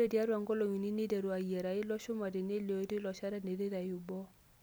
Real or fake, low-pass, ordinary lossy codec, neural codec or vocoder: real; none; none; none